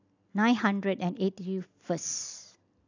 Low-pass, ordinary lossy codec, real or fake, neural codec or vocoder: 7.2 kHz; AAC, 48 kbps; real; none